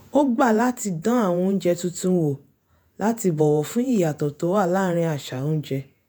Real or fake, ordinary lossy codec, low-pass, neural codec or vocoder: fake; none; none; vocoder, 48 kHz, 128 mel bands, Vocos